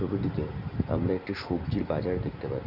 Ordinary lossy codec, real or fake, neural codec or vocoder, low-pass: none; real; none; 5.4 kHz